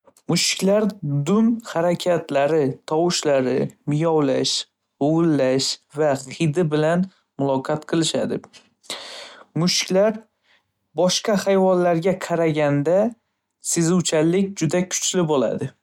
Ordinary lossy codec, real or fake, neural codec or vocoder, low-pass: MP3, 96 kbps; real; none; 19.8 kHz